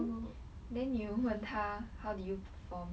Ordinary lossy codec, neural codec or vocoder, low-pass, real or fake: none; none; none; real